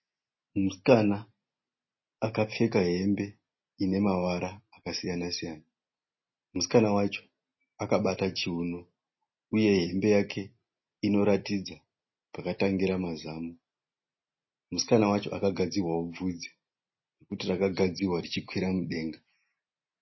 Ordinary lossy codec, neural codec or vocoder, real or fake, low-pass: MP3, 24 kbps; none; real; 7.2 kHz